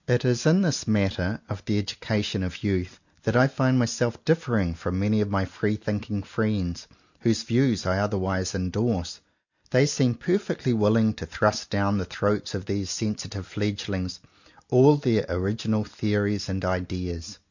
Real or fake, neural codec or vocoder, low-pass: real; none; 7.2 kHz